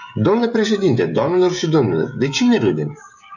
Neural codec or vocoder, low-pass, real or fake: codec, 16 kHz, 16 kbps, FreqCodec, smaller model; 7.2 kHz; fake